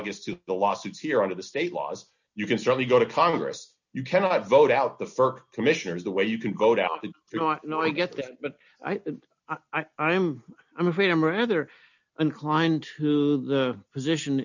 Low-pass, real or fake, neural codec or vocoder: 7.2 kHz; real; none